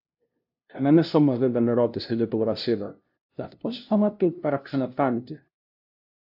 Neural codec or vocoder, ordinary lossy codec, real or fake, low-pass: codec, 16 kHz, 0.5 kbps, FunCodec, trained on LibriTTS, 25 frames a second; AAC, 48 kbps; fake; 5.4 kHz